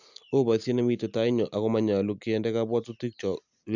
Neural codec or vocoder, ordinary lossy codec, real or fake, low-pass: none; none; real; 7.2 kHz